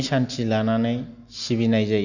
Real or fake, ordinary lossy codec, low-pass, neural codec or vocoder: real; none; 7.2 kHz; none